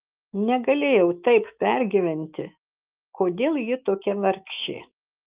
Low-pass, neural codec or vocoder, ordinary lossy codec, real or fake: 3.6 kHz; autoencoder, 48 kHz, 128 numbers a frame, DAC-VAE, trained on Japanese speech; Opus, 32 kbps; fake